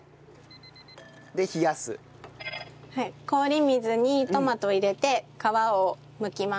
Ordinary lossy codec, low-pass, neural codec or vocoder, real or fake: none; none; none; real